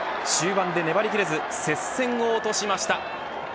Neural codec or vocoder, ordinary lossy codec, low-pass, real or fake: none; none; none; real